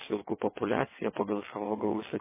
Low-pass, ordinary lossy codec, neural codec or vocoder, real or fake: 3.6 kHz; MP3, 16 kbps; vocoder, 22.05 kHz, 80 mel bands, WaveNeXt; fake